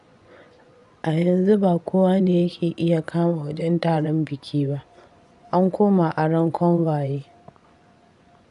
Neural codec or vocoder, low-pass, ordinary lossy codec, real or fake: vocoder, 24 kHz, 100 mel bands, Vocos; 10.8 kHz; none; fake